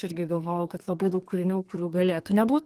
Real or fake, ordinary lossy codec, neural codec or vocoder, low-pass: fake; Opus, 24 kbps; codec, 32 kHz, 1.9 kbps, SNAC; 14.4 kHz